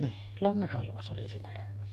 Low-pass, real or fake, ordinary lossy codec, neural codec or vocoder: 14.4 kHz; fake; none; codec, 44.1 kHz, 2.6 kbps, DAC